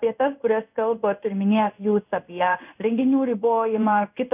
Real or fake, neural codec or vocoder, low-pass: fake; codec, 16 kHz in and 24 kHz out, 1 kbps, XY-Tokenizer; 3.6 kHz